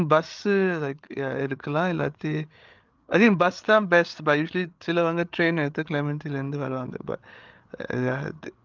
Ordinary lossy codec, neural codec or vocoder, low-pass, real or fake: Opus, 32 kbps; codec, 16 kHz, 8 kbps, FreqCodec, larger model; 7.2 kHz; fake